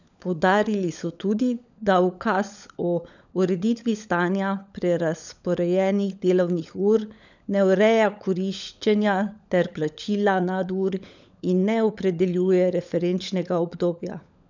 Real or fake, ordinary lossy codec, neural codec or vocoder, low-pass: fake; none; codec, 16 kHz, 16 kbps, FunCodec, trained on LibriTTS, 50 frames a second; 7.2 kHz